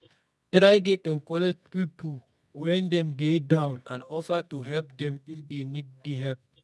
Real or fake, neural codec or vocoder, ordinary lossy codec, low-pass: fake; codec, 24 kHz, 0.9 kbps, WavTokenizer, medium music audio release; none; none